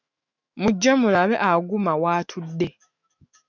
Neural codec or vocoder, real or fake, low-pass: autoencoder, 48 kHz, 128 numbers a frame, DAC-VAE, trained on Japanese speech; fake; 7.2 kHz